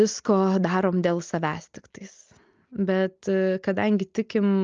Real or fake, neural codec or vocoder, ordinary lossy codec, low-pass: real; none; Opus, 24 kbps; 7.2 kHz